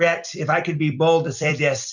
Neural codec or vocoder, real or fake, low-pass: vocoder, 44.1 kHz, 128 mel bands every 256 samples, BigVGAN v2; fake; 7.2 kHz